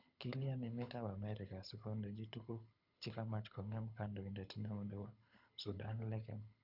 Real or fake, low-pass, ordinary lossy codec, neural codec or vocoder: fake; 5.4 kHz; none; codec, 24 kHz, 6 kbps, HILCodec